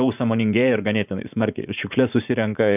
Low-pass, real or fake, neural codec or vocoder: 3.6 kHz; fake; vocoder, 44.1 kHz, 80 mel bands, Vocos